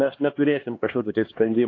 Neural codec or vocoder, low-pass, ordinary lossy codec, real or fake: codec, 16 kHz, 2 kbps, X-Codec, HuBERT features, trained on LibriSpeech; 7.2 kHz; AAC, 32 kbps; fake